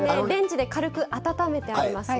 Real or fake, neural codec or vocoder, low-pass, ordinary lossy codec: real; none; none; none